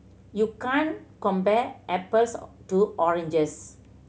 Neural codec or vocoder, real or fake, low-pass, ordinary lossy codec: none; real; none; none